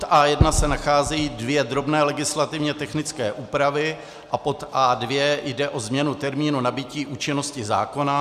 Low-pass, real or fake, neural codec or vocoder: 14.4 kHz; real; none